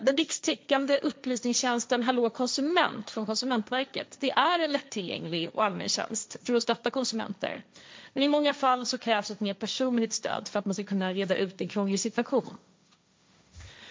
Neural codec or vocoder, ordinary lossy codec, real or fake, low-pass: codec, 16 kHz, 1.1 kbps, Voila-Tokenizer; none; fake; none